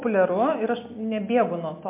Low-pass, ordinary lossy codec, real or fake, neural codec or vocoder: 3.6 kHz; MP3, 24 kbps; real; none